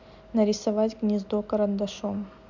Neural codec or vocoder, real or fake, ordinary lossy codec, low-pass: none; real; none; 7.2 kHz